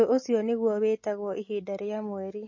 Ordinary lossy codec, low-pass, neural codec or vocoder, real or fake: MP3, 32 kbps; 7.2 kHz; none; real